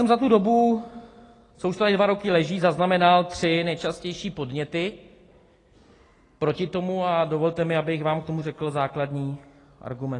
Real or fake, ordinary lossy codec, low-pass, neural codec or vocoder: real; AAC, 32 kbps; 10.8 kHz; none